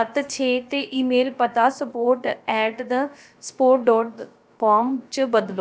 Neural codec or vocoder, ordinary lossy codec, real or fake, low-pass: codec, 16 kHz, about 1 kbps, DyCAST, with the encoder's durations; none; fake; none